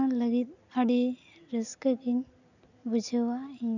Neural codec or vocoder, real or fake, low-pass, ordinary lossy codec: none; real; 7.2 kHz; none